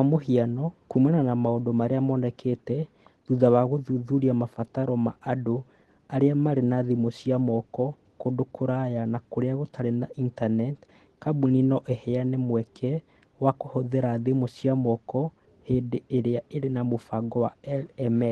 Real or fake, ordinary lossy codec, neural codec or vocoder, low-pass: real; Opus, 16 kbps; none; 10.8 kHz